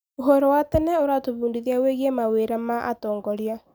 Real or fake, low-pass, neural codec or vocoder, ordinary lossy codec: real; none; none; none